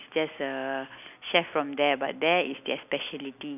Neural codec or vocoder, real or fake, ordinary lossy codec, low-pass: none; real; none; 3.6 kHz